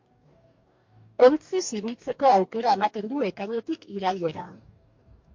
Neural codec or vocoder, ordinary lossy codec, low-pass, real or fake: codec, 44.1 kHz, 2.6 kbps, DAC; MP3, 48 kbps; 7.2 kHz; fake